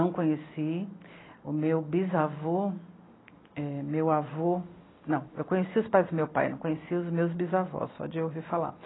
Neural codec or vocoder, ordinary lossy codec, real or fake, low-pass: none; AAC, 16 kbps; real; 7.2 kHz